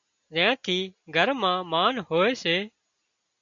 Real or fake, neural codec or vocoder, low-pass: real; none; 7.2 kHz